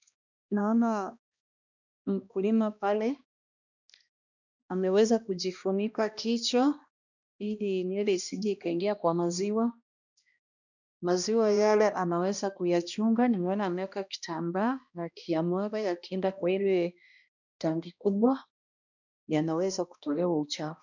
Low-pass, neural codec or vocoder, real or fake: 7.2 kHz; codec, 16 kHz, 1 kbps, X-Codec, HuBERT features, trained on balanced general audio; fake